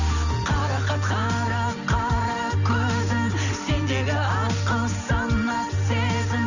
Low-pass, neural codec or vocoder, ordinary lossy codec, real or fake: 7.2 kHz; none; none; real